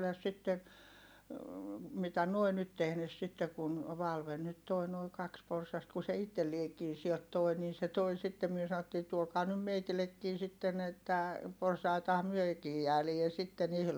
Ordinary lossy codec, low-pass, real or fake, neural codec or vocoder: none; none; real; none